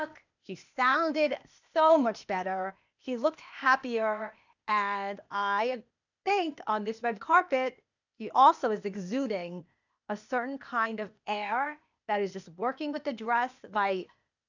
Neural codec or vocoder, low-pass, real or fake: codec, 16 kHz, 0.8 kbps, ZipCodec; 7.2 kHz; fake